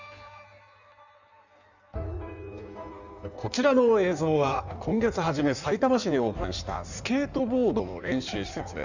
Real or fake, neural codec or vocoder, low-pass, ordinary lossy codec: fake; codec, 16 kHz in and 24 kHz out, 1.1 kbps, FireRedTTS-2 codec; 7.2 kHz; none